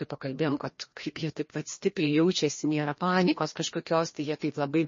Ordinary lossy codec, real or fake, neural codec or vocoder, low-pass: MP3, 32 kbps; fake; codec, 16 kHz, 1 kbps, FreqCodec, larger model; 7.2 kHz